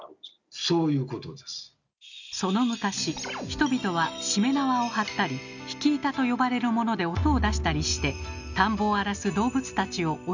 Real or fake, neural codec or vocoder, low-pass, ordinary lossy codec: real; none; 7.2 kHz; none